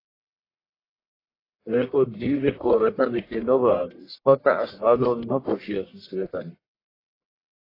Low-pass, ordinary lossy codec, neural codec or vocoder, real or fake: 5.4 kHz; AAC, 24 kbps; codec, 44.1 kHz, 1.7 kbps, Pupu-Codec; fake